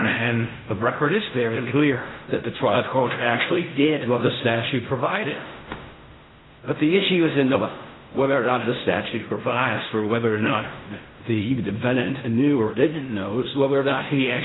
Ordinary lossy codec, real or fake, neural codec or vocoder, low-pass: AAC, 16 kbps; fake; codec, 16 kHz in and 24 kHz out, 0.4 kbps, LongCat-Audio-Codec, fine tuned four codebook decoder; 7.2 kHz